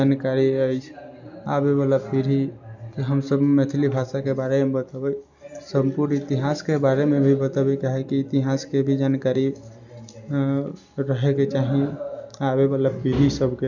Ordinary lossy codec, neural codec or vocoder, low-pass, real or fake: none; none; 7.2 kHz; real